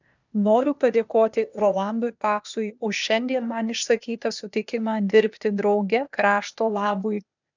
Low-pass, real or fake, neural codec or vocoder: 7.2 kHz; fake; codec, 16 kHz, 0.8 kbps, ZipCodec